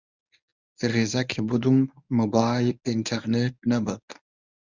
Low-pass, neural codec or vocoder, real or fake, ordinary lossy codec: 7.2 kHz; codec, 24 kHz, 0.9 kbps, WavTokenizer, medium speech release version 1; fake; Opus, 64 kbps